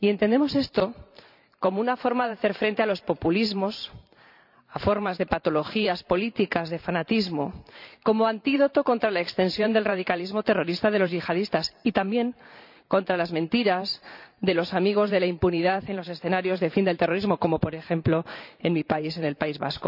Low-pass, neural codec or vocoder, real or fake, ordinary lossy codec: 5.4 kHz; vocoder, 44.1 kHz, 128 mel bands every 512 samples, BigVGAN v2; fake; none